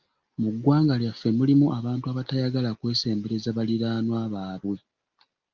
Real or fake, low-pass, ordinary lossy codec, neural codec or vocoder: real; 7.2 kHz; Opus, 32 kbps; none